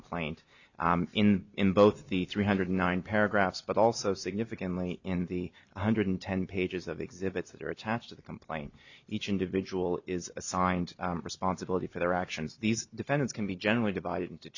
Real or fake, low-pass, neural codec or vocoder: real; 7.2 kHz; none